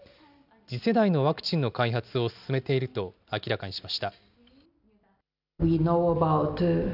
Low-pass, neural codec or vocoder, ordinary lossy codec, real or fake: 5.4 kHz; none; none; real